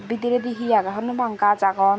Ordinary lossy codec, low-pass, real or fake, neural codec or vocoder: none; none; real; none